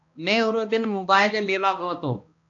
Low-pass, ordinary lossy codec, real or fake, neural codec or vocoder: 7.2 kHz; AAC, 48 kbps; fake; codec, 16 kHz, 1 kbps, X-Codec, HuBERT features, trained on balanced general audio